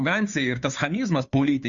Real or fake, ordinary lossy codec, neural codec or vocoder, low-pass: fake; MP3, 64 kbps; codec, 16 kHz, 2 kbps, FunCodec, trained on Chinese and English, 25 frames a second; 7.2 kHz